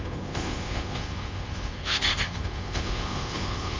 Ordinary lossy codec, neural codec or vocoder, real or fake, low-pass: Opus, 32 kbps; codec, 24 kHz, 1.2 kbps, DualCodec; fake; 7.2 kHz